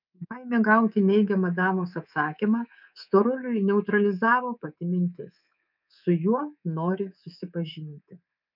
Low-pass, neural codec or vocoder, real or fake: 5.4 kHz; codec, 24 kHz, 3.1 kbps, DualCodec; fake